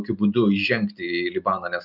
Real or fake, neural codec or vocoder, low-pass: real; none; 5.4 kHz